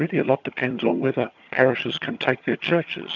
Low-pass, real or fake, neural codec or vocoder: 7.2 kHz; fake; vocoder, 22.05 kHz, 80 mel bands, HiFi-GAN